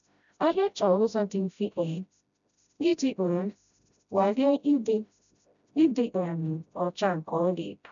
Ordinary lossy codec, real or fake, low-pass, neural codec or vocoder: none; fake; 7.2 kHz; codec, 16 kHz, 0.5 kbps, FreqCodec, smaller model